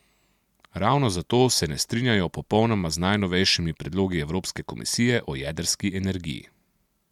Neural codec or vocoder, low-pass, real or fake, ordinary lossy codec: none; 19.8 kHz; real; MP3, 96 kbps